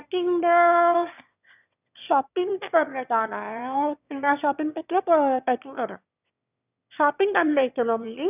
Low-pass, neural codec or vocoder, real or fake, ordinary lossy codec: 3.6 kHz; autoencoder, 22.05 kHz, a latent of 192 numbers a frame, VITS, trained on one speaker; fake; none